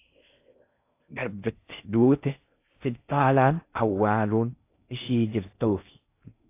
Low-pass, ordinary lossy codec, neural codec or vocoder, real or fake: 3.6 kHz; AAC, 24 kbps; codec, 16 kHz in and 24 kHz out, 0.6 kbps, FocalCodec, streaming, 4096 codes; fake